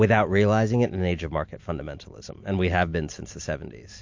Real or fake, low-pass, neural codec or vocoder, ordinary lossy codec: real; 7.2 kHz; none; MP3, 48 kbps